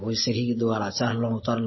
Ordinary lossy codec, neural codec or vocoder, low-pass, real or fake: MP3, 24 kbps; none; 7.2 kHz; real